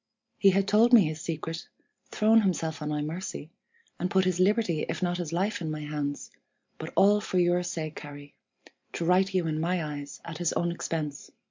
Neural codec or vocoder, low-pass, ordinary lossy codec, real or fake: none; 7.2 kHz; MP3, 64 kbps; real